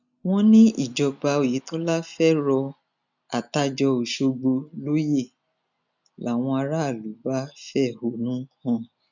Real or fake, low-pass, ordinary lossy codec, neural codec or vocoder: real; 7.2 kHz; none; none